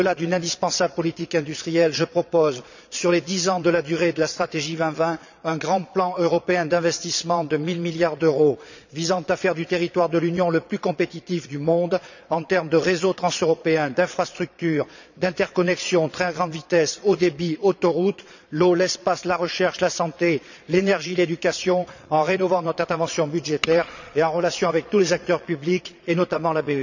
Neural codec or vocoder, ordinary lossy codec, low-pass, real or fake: vocoder, 22.05 kHz, 80 mel bands, Vocos; none; 7.2 kHz; fake